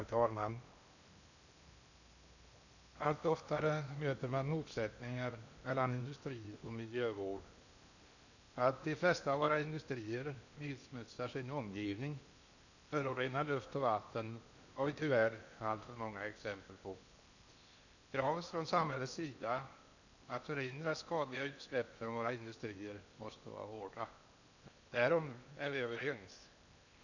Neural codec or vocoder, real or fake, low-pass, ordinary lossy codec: codec, 16 kHz in and 24 kHz out, 0.8 kbps, FocalCodec, streaming, 65536 codes; fake; 7.2 kHz; none